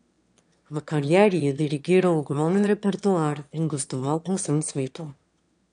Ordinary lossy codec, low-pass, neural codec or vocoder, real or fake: none; 9.9 kHz; autoencoder, 22.05 kHz, a latent of 192 numbers a frame, VITS, trained on one speaker; fake